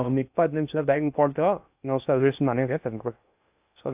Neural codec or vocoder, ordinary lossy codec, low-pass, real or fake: codec, 16 kHz in and 24 kHz out, 0.6 kbps, FocalCodec, streaming, 2048 codes; AAC, 32 kbps; 3.6 kHz; fake